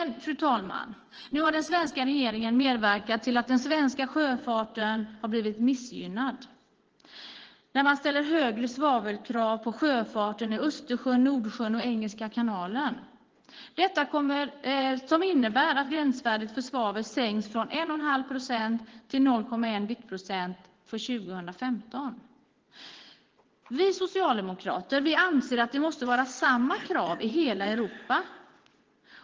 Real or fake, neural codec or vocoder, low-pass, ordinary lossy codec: fake; vocoder, 22.05 kHz, 80 mel bands, WaveNeXt; 7.2 kHz; Opus, 16 kbps